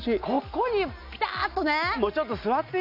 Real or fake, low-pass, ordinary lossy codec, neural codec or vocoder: real; 5.4 kHz; none; none